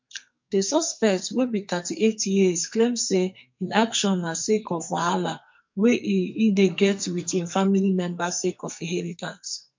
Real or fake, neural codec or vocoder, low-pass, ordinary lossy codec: fake; codec, 44.1 kHz, 2.6 kbps, SNAC; 7.2 kHz; MP3, 48 kbps